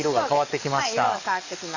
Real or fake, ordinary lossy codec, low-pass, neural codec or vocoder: fake; none; 7.2 kHz; codec, 44.1 kHz, 7.8 kbps, DAC